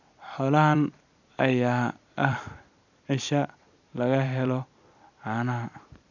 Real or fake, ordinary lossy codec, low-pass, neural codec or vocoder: fake; none; 7.2 kHz; vocoder, 44.1 kHz, 128 mel bands every 256 samples, BigVGAN v2